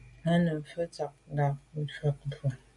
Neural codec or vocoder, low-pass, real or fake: none; 10.8 kHz; real